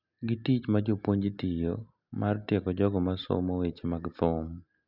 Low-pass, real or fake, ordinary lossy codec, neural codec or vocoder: 5.4 kHz; real; none; none